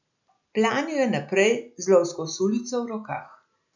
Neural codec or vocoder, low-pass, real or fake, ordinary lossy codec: none; 7.2 kHz; real; none